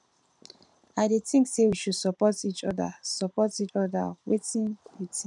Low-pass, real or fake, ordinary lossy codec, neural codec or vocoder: 10.8 kHz; fake; none; vocoder, 44.1 kHz, 128 mel bands every 512 samples, BigVGAN v2